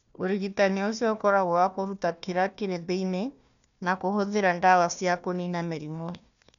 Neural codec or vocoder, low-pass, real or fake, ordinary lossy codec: codec, 16 kHz, 1 kbps, FunCodec, trained on Chinese and English, 50 frames a second; 7.2 kHz; fake; none